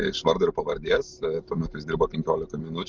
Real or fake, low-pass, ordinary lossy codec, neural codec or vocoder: real; 7.2 kHz; Opus, 32 kbps; none